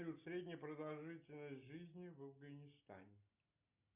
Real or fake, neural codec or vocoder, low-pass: real; none; 3.6 kHz